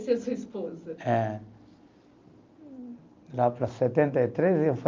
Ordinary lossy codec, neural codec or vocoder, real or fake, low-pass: Opus, 24 kbps; none; real; 7.2 kHz